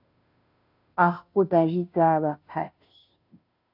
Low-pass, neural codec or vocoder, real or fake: 5.4 kHz; codec, 16 kHz, 0.5 kbps, FunCodec, trained on Chinese and English, 25 frames a second; fake